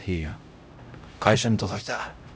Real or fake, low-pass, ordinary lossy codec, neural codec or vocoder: fake; none; none; codec, 16 kHz, 0.5 kbps, X-Codec, HuBERT features, trained on LibriSpeech